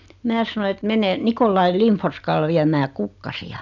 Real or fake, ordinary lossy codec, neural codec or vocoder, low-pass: real; none; none; 7.2 kHz